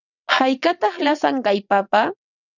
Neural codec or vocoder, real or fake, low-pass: vocoder, 22.05 kHz, 80 mel bands, WaveNeXt; fake; 7.2 kHz